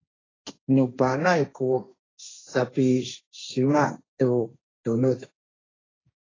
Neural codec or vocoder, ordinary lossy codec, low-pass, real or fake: codec, 16 kHz, 1.1 kbps, Voila-Tokenizer; AAC, 32 kbps; 7.2 kHz; fake